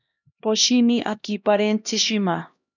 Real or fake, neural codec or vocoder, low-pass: fake; codec, 16 kHz, 1 kbps, X-Codec, HuBERT features, trained on LibriSpeech; 7.2 kHz